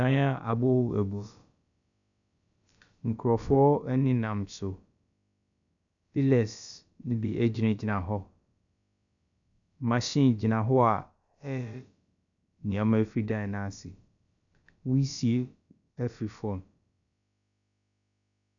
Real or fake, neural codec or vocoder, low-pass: fake; codec, 16 kHz, about 1 kbps, DyCAST, with the encoder's durations; 7.2 kHz